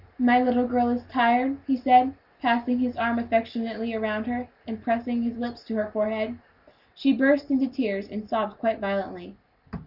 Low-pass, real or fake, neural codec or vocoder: 5.4 kHz; real; none